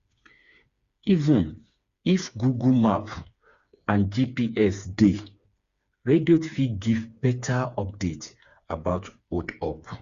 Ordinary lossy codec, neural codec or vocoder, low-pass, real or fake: Opus, 64 kbps; codec, 16 kHz, 4 kbps, FreqCodec, smaller model; 7.2 kHz; fake